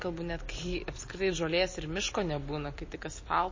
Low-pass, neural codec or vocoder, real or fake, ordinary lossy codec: 7.2 kHz; none; real; MP3, 32 kbps